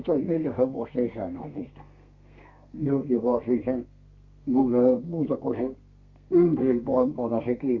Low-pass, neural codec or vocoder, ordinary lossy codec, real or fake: 7.2 kHz; codec, 32 kHz, 1.9 kbps, SNAC; Opus, 64 kbps; fake